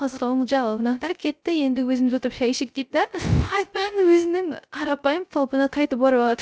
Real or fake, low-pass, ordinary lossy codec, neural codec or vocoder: fake; none; none; codec, 16 kHz, 0.3 kbps, FocalCodec